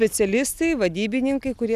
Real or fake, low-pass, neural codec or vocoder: real; 14.4 kHz; none